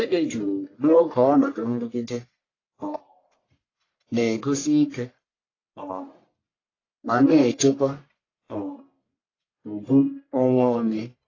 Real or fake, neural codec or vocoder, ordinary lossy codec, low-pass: fake; codec, 44.1 kHz, 1.7 kbps, Pupu-Codec; AAC, 32 kbps; 7.2 kHz